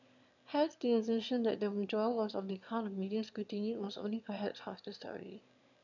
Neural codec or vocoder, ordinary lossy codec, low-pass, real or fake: autoencoder, 22.05 kHz, a latent of 192 numbers a frame, VITS, trained on one speaker; none; 7.2 kHz; fake